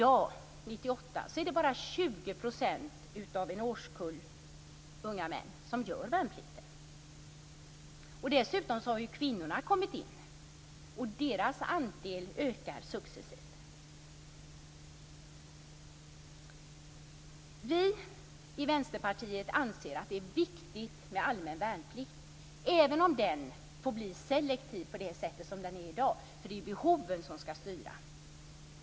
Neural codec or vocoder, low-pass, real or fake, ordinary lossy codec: none; none; real; none